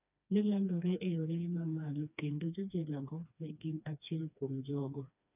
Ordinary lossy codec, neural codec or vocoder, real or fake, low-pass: none; codec, 16 kHz, 2 kbps, FreqCodec, smaller model; fake; 3.6 kHz